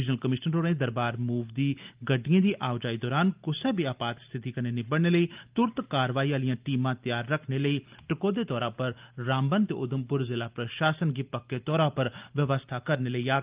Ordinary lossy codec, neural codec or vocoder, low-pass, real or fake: Opus, 32 kbps; none; 3.6 kHz; real